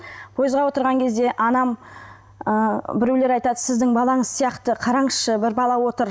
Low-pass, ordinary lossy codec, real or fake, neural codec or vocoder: none; none; real; none